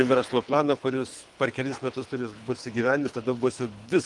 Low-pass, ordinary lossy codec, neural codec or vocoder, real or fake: 10.8 kHz; Opus, 32 kbps; codec, 24 kHz, 3 kbps, HILCodec; fake